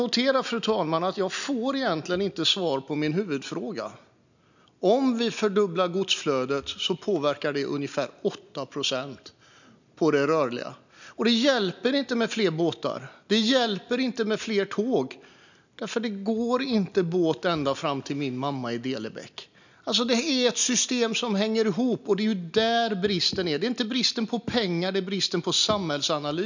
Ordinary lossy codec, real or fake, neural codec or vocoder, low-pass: none; real; none; 7.2 kHz